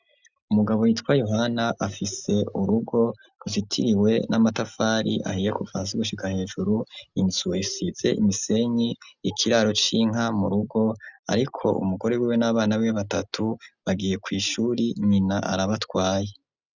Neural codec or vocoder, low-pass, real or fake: none; 7.2 kHz; real